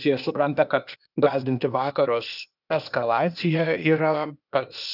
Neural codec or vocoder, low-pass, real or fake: codec, 16 kHz, 0.8 kbps, ZipCodec; 5.4 kHz; fake